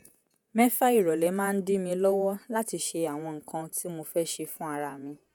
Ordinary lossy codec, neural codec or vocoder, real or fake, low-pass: none; vocoder, 48 kHz, 128 mel bands, Vocos; fake; none